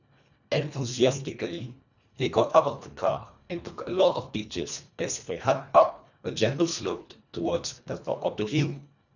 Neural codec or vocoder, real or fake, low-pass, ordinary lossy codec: codec, 24 kHz, 1.5 kbps, HILCodec; fake; 7.2 kHz; none